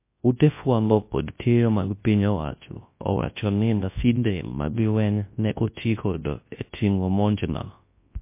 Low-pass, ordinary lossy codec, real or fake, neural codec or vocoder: 3.6 kHz; MP3, 24 kbps; fake; codec, 24 kHz, 0.9 kbps, WavTokenizer, large speech release